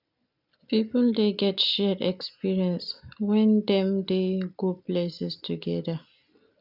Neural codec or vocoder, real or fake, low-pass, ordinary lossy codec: none; real; 5.4 kHz; none